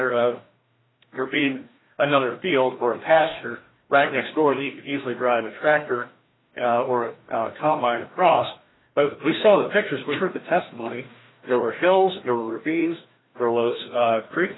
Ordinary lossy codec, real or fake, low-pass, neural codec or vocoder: AAC, 16 kbps; fake; 7.2 kHz; codec, 16 kHz, 1 kbps, FreqCodec, larger model